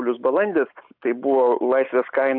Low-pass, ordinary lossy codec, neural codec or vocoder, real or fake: 5.4 kHz; AAC, 48 kbps; none; real